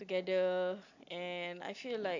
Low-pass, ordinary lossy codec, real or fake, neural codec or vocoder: 7.2 kHz; AAC, 48 kbps; real; none